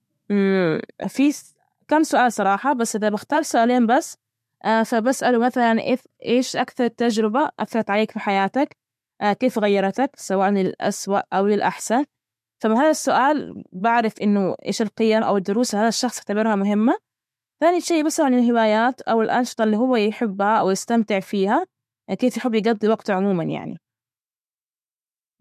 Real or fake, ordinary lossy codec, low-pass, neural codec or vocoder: fake; MP3, 64 kbps; 14.4 kHz; autoencoder, 48 kHz, 128 numbers a frame, DAC-VAE, trained on Japanese speech